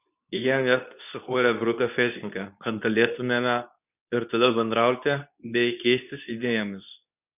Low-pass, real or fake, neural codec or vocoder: 3.6 kHz; fake; codec, 24 kHz, 0.9 kbps, WavTokenizer, medium speech release version 2